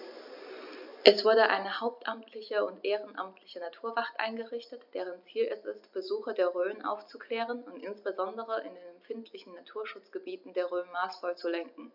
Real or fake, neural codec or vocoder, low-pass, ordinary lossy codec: real; none; 5.4 kHz; none